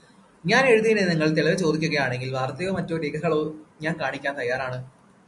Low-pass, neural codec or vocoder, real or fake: 10.8 kHz; none; real